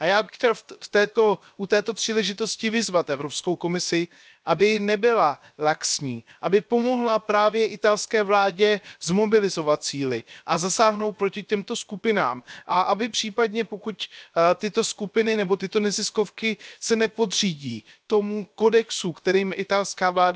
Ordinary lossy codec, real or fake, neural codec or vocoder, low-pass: none; fake; codec, 16 kHz, 0.7 kbps, FocalCodec; none